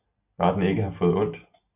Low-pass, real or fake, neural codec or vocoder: 3.6 kHz; real; none